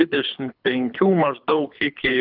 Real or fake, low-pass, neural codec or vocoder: fake; 5.4 kHz; codec, 24 kHz, 3 kbps, HILCodec